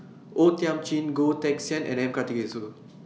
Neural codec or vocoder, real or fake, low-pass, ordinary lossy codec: none; real; none; none